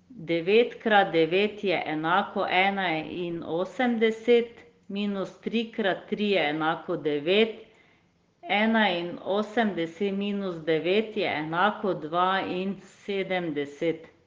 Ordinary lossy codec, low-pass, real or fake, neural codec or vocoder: Opus, 16 kbps; 7.2 kHz; real; none